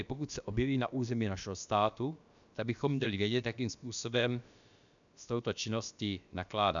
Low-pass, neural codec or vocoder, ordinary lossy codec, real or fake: 7.2 kHz; codec, 16 kHz, about 1 kbps, DyCAST, with the encoder's durations; MP3, 96 kbps; fake